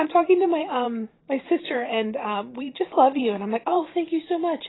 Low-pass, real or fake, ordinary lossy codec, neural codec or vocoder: 7.2 kHz; fake; AAC, 16 kbps; vocoder, 44.1 kHz, 128 mel bands every 512 samples, BigVGAN v2